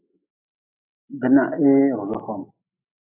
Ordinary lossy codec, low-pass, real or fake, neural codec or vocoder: AAC, 32 kbps; 3.6 kHz; real; none